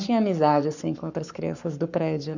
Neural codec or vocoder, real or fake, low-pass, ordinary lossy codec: codec, 44.1 kHz, 7.8 kbps, Pupu-Codec; fake; 7.2 kHz; none